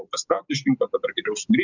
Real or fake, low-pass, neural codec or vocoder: real; 7.2 kHz; none